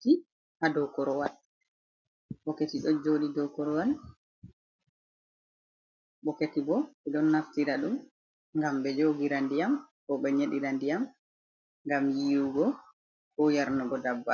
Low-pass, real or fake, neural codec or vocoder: 7.2 kHz; real; none